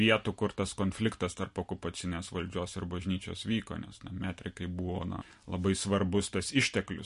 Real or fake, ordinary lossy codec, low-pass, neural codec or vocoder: real; MP3, 48 kbps; 10.8 kHz; none